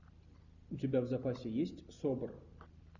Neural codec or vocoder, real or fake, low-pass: none; real; 7.2 kHz